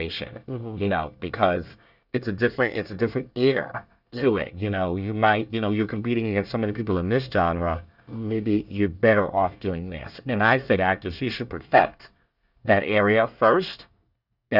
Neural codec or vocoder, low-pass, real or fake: codec, 24 kHz, 1 kbps, SNAC; 5.4 kHz; fake